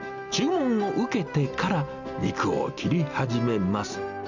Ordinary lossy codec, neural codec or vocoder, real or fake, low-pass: none; none; real; 7.2 kHz